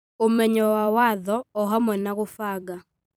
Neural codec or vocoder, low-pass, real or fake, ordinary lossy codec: vocoder, 44.1 kHz, 128 mel bands every 256 samples, BigVGAN v2; none; fake; none